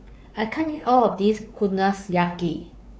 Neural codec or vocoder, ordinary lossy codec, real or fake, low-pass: codec, 16 kHz, 4 kbps, X-Codec, WavLM features, trained on Multilingual LibriSpeech; none; fake; none